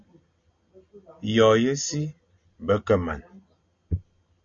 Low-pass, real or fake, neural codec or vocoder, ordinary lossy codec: 7.2 kHz; real; none; MP3, 48 kbps